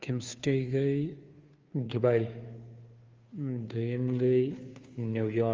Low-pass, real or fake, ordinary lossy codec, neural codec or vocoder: 7.2 kHz; fake; Opus, 32 kbps; codec, 16 kHz, 2 kbps, FunCodec, trained on Chinese and English, 25 frames a second